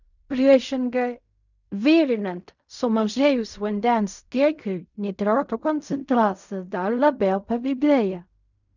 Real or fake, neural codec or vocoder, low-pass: fake; codec, 16 kHz in and 24 kHz out, 0.4 kbps, LongCat-Audio-Codec, fine tuned four codebook decoder; 7.2 kHz